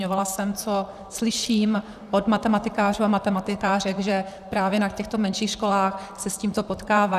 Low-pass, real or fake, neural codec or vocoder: 14.4 kHz; fake; vocoder, 44.1 kHz, 128 mel bands, Pupu-Vocoder